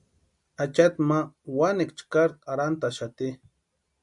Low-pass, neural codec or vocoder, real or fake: 10.8 kHz; none; real